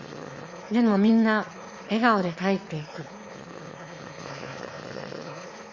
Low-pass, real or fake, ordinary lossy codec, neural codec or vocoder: 7.2 kHz; fake; Opus, 64 kbps; autoencoder, 22.05 kHz, a latent of 192 numbers a frame, VITS, trained on one speaker